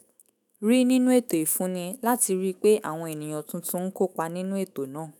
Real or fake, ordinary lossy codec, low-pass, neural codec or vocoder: fake; none; none; autoencoder, 48 kHz, 128 numbers a frame, DAC-VAE, trained on Japanese speech